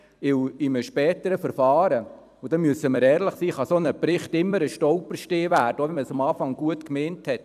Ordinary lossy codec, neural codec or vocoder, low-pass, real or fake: none; vocoder, 44.1 kHz, 128 mel bands every 256 samples, BigVGAN v2; 14.4 kHz; fake